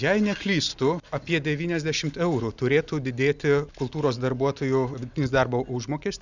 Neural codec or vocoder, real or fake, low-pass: none; real; 7.2 kHz